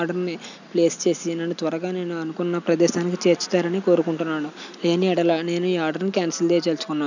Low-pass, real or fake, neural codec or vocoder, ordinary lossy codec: 7.2 kHz; real; none; none